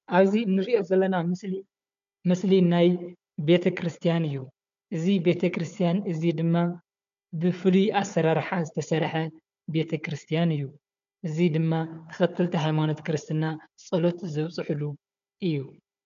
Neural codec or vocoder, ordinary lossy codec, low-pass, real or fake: codec, 16 kHz, 4 kbps, FunCodec, trained on Chinese and English, 50 frames a second; MP3, 64 kbps; 7.2 kHz; fake